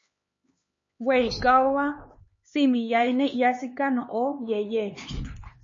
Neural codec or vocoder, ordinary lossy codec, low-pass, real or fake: codec, 16 kHz, 4 kbps, X-Codec, HuBERT features, trained on LibriSpeech; MP3, 32 kbps; 7.2 kHz; fake